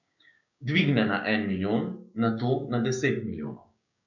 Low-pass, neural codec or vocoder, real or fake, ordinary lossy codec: 7.2 kHz; codec, 16 kHz, 6 kbps, DAC; fake; none